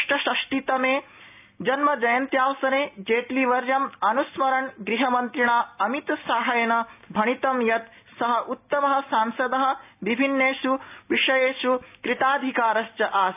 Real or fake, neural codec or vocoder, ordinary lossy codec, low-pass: real; none; AAC, 32 kbps; 3.6 kHz